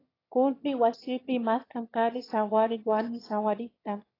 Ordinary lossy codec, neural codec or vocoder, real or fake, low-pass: AAC, 24 kbps; autoencoder, 22.05 kHz, a latent of 192 numbers a frame, VITS, trained on one speaker; fake; 5.4 kHz